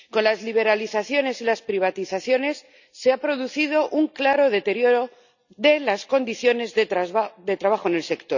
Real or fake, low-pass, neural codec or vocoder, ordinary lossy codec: real; 7.2 kHz; none; none